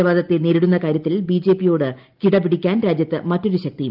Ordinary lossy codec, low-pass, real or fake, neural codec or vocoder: Opus, 32 kbps; 5.4 kHz; real; none